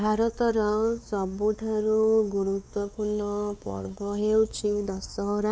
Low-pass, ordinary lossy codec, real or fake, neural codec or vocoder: none; none; fake; codec, 16 kHz, 4 kbps, X-Codec, WavLM features, trained on Multilingual LibriSpeech